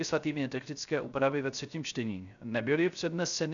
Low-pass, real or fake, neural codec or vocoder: 7.2 kHz; fake; codec, 16 kHz, 0.3 kbps, FocalCodec